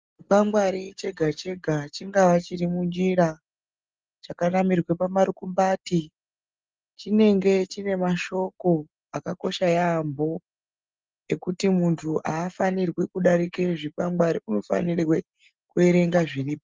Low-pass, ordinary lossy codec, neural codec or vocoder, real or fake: 7.2 kHz; Opus, 24 kbps; none; real